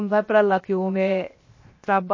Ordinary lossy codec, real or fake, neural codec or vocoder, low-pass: MP3, 32 kbps; fake; codec, 16 kHz, 0.7 kbps, FocalCodec; 7.2 kHz